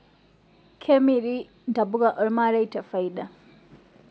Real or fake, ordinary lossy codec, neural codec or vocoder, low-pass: real; none; none; none